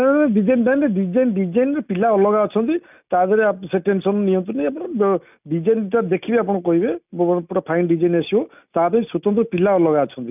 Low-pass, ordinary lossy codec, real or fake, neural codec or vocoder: 3.6 kHz; none; real; none